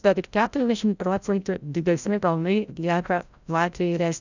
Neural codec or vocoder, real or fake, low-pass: codec, 16 kHz, 0.5 kbps, FreqCodec, larger model; fake; 7.2 kHz